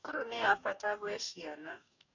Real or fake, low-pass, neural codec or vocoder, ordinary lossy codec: fake; 7.2 kHz; codec, 44.1 kHz, 2.6 kbps, DAC; none